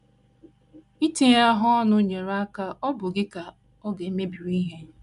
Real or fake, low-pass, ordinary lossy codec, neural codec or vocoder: real; 10.8 kHz; none; none